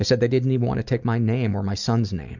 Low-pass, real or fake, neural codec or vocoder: 7.2 kHz; real; none